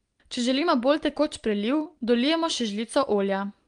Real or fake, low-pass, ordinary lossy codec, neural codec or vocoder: real; 9.9 kHz; Opus, 32 kbps; none